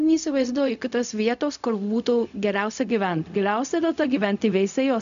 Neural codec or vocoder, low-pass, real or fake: codec, 16 kHz, 0.4 kbps, LongCat-Audio-Codec; 7.2 kHz; fake